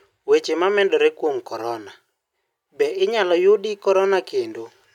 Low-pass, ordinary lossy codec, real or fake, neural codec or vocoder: 19.8 kHz; none; real; none